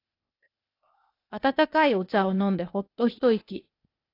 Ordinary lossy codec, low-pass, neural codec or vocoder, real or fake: AAC, 32 kbps; 5.4 kHz; codec, 16 kHz, 0.8 kbps, ZipCodec; fake